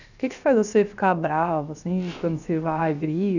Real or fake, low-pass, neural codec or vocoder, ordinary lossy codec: fake; 7.2 kHz; codec, 16 kHz, about 1 kbps, DyCAST, with the encoder's durations; none